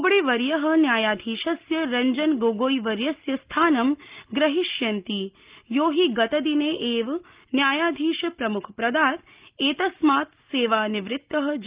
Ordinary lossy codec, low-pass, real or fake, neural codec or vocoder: Opus, 32 kbps; 3.6 kHz; real; none